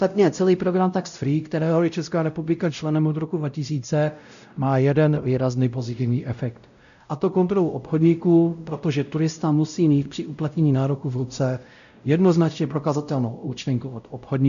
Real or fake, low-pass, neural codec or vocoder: fake; 7.2 kHz; codec, 16 kHz, 0.5 kbps, X-Codec, WavLM features, trained on Multilingual LibriSpeech